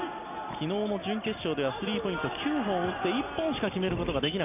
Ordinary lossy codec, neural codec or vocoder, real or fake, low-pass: none; none; real; 3.6 kHz